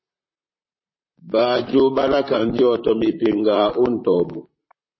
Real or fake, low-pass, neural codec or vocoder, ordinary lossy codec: fake; 7.2 kHz; vocoder, 44.1 kHz, 128 mel bands, Pupu-Vocoder; MP3, 24 kbps